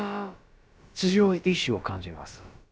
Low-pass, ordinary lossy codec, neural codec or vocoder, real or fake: none; none; codec, 16 kHz, about 1 kbps, DyCAST, with the encoder's durations; fake